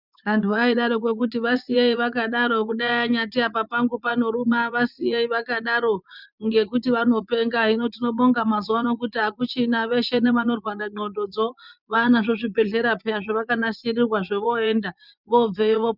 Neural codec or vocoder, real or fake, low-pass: vocoder, 24 kHz, 100 mel bands, Vocos; fake; 5.4 kHz